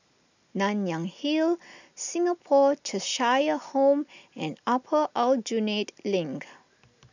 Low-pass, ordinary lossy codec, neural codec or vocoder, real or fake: 7.2 kHz; none; none; real